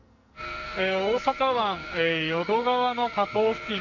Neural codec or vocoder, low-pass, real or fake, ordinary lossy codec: codec, 44.1 kHz, 2.6 kbps, SNAC; 7.2 kHz; fake; none